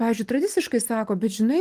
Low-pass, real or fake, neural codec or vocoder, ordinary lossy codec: 14.4 kHz; real; none; Opus, 24 kbps